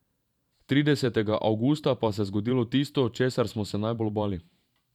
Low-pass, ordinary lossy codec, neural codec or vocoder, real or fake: 19.8 kHz; none; vocoder, 44.1 kHz, 128 mel bands every 512 samples, BigVGAN v2; fake